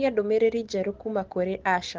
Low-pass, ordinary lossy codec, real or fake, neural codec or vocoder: 7.2 kHz; Opus, 16 kbps; real; none